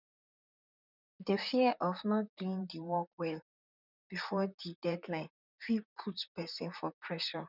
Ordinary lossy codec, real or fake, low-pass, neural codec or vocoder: none; fake; 5.4 kHz; vocoder, 44.1 kHz, 128 mel bands, Pupu-Vocoder